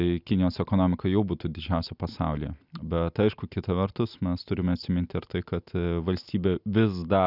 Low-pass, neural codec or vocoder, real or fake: 5.4 kHz; none; real